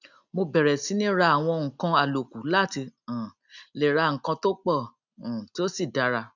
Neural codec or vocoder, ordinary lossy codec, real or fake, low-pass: none; none; real; 7.2 kHz